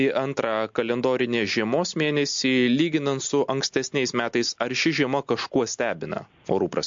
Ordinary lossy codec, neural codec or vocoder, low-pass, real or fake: MP3, 48 kbps; none; 7.2 kHz; real